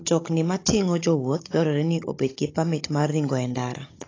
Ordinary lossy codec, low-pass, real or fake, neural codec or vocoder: AAC, 32 kbps; 7.2 kHz; fake; codec, 16 kHz, 16 kbps, FunCodec, trained on Chinese and English, 50 frames a second